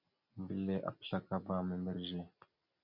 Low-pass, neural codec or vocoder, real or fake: 5.4 kHz; none; real